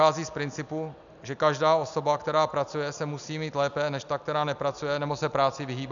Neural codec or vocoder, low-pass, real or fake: none; 7.2 kHz; real